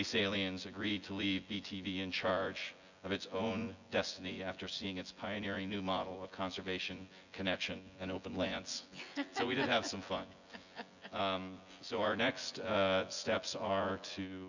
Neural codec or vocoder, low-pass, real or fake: vocoder, 24 kHz, 100 mel bands, Vocos; 7.2 kHz; fake